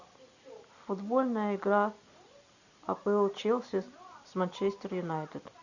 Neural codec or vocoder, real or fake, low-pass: none; real; 7.2 kHz